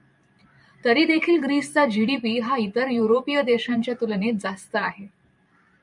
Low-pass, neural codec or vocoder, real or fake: 10.8 kHz; vocoder, 44.1 kHz, 128 mel bands every 512 samples, BigVGAN v2; fake